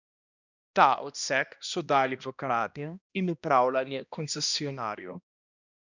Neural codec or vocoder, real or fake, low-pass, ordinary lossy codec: codec, 16 kHz, 1 kbps, X-Codec, HuBERT features, trained on balanced general audio; fake; 7.2 kHz; none